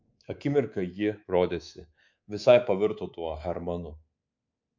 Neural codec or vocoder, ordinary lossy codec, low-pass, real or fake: codec, 24 kHz, 3.1 kbps, DualCodec; MP3, 64 kbps; 7.2 kHz; fake